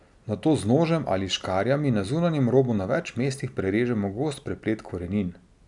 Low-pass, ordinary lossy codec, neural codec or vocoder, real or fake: 10.8 kHz; none; none; real